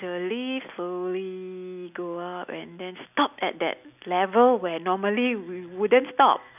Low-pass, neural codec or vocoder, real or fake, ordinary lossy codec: 3.6 kHz; none; real; none